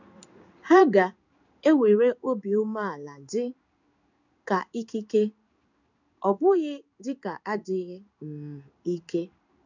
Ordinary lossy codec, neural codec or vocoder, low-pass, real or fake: none; codec, 16 kHz in and 24 kHz out, 1 kbps, XY-Tokenizer; 7.2 kHz; fake